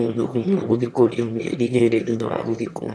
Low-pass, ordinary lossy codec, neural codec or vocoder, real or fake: none; none; autoencoder, 22.05 kHz, a latent of 192 numbers a frame, VITS, trained on one speaker; fake